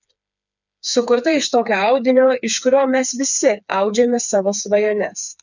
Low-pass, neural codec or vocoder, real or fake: 7.2 kHz; codec, 16 kHz, 4 kbps, FreqCodec, smaller model; fake